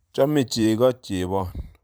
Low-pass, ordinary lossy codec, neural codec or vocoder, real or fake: none; none; vocoder, 44.1 kHz, 128 mel bands every 512 samples, BigVGAN v2; fake